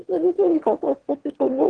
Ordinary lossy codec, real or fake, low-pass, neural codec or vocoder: Opus, 16 kbps; fake; 9.9 kHz; autoencoder, 22.05 kHz, a latent of 192 numbers a frame, VITS, trained on one speaker